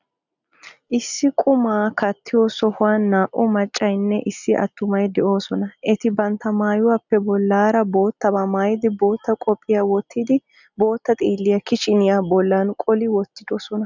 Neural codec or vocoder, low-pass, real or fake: none; 7.2 kHz; real